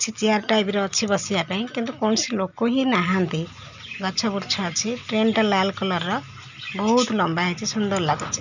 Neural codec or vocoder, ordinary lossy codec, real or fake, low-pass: none; none; real; 7.2 kHz